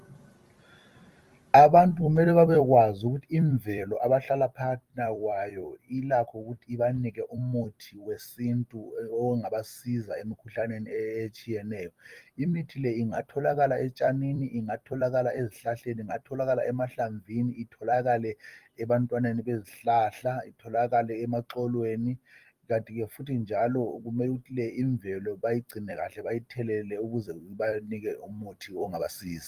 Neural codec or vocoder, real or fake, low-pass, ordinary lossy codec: vocoder, 44.1 kHz, 128 mel bands every 256 samples, BigVGAN v2; fake; 14.4 kHz; Opus, 32 kbps